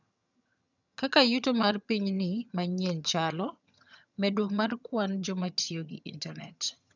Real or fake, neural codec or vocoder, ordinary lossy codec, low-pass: fake; vocoder, 22.05 kHz, 80 mel bands, HiFi-GAN; none; 7.2 kHz